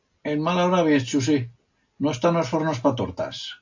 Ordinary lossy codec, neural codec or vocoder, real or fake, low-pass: AAC, 48 kbps; none; real; 7.2 kHz